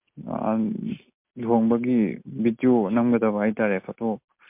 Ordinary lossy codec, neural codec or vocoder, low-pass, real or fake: MP3, 32 kbps; none; 3.6 kHz; real